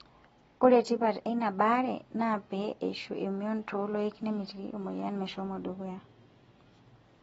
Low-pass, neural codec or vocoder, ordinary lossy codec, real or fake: 14.4 kHz; none; AAC, 24 kbps; real